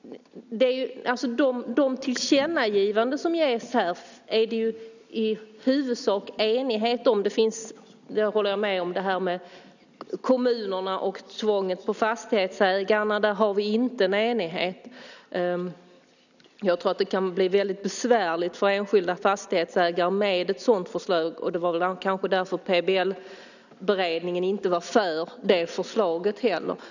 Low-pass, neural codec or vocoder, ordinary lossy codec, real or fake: 7.2 kHz; none; none; real